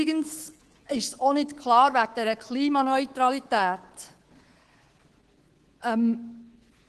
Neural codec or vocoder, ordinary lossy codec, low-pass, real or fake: codec, 24 kHz, 3.1 kbps, DualCodec; Opus, 16 kbps; 10.8 kHz; fake